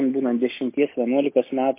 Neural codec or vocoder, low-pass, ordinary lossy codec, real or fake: none; 3.6 kHz; MP3, 24 kbps; real